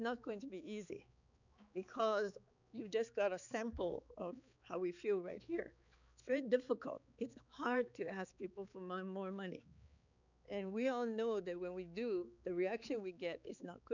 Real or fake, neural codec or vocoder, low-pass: fake; codec, 16 kHz, 4 kbps, X-Codec, HuBERT features, trained on balanced general audio; 7.2 kHz